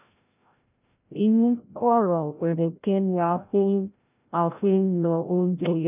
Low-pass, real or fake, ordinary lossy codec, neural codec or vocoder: 3.6 kHz; fake; none; codec, 16 kHz, 0.5 kbps, FreqCodec, larger model